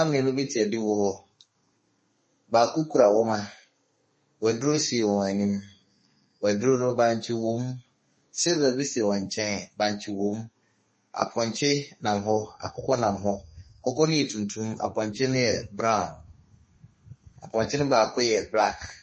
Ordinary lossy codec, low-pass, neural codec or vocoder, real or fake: MP3, 32 kbps; 10.8 kHz; codec, 32 kHz, 1.9 kbps, SNAC; fake